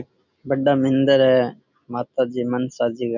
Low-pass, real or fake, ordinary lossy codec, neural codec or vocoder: 7.2 kHz; real; none; none